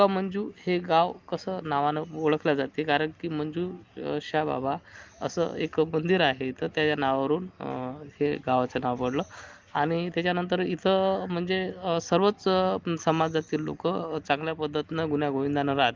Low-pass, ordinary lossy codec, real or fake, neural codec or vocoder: 7.2 kHz; Opus, 32 kbps; real; none